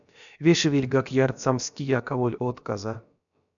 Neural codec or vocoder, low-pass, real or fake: codec, 16 kHz, about 1 kbps, DyCAST, with the encoder's durations; 7.2 kHz; fake